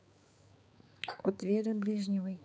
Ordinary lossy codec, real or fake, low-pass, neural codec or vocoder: none; fake; none; codec, 16 kHz, 4 kbps, X-Codec, HuBERT features, trained on balanced general audio